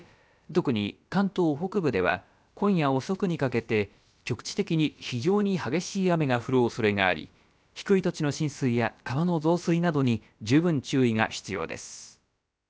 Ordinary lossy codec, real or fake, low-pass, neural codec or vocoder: none; fake; none; codec, 16 kHz, about 1 kbps, DyCAST, with the encoder's durations